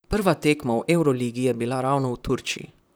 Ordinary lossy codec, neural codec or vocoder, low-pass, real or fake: none; vocoder, 44.1 kHz, 128 mel bands, Pupu-Vocoder; none; fake